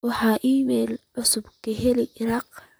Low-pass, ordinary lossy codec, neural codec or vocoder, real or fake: none; none; vocoder, 44.1 kHz, 128 mel bands, Pupu-Vocoder; fake